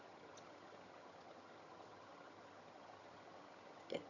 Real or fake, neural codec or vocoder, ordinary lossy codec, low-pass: fake; vocoder, 22.05 kHz, 80 mel bands, HiFi-GAN; none; 7.2 kHz